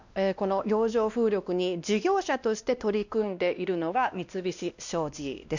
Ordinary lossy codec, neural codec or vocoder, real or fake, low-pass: none; codec, 16 kHz, 1 kbps, X-Codec, WavLM features, trained on Multilingual LibriSpeech; fake; 7.2 kHz